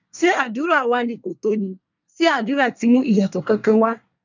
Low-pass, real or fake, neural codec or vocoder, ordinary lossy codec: 7.2 kHz; fake; codec, 24 kHz, 1 kbps, SNAC; none